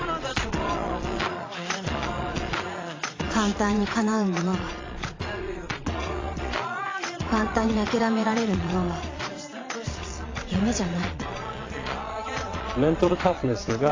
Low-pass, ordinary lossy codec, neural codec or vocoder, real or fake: 7.2 kHz; AAC, 32 kbps; vocoder, 22.05 kHz, 80 mel bands, Vocos; fake